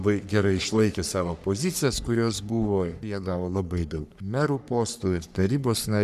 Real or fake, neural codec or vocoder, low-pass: fake; codec, 44.1 kHz, 3.4 kbps, Pupu-Codec; 14.4 kHz